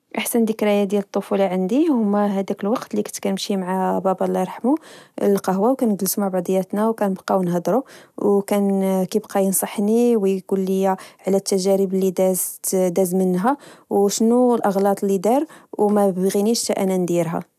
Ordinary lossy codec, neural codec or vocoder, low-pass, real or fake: none; none; 14.4 kHz; real